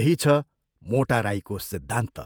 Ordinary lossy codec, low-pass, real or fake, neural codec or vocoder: none; none; fake; vocoder, 48 kHz, 128 mel bands, Vocos